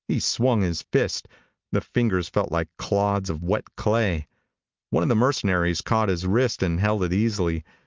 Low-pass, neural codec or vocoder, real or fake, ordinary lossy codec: 7.2 kHz; none; real; Opus, 24 kbps